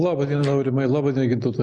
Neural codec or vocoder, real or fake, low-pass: none; real; 9.9 kHz